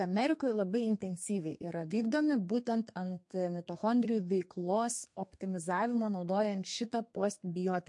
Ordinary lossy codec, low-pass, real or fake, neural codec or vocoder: MP3, 48 kbps; 10.8 kHz; fake; codec, 32 kHz, 1.9 kbps, SNAC